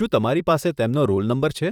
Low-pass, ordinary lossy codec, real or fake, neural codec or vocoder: 19.8 kHz; none; fake; vocoder, 44.1 kHz, 128 mel bands, Pupu-Vocoder